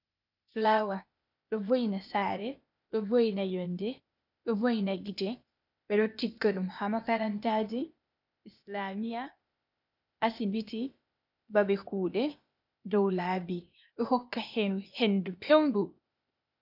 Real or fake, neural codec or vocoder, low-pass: fake; codec, 16 kHz, 0.8 kbps, ZipCodec; 5.4 kHz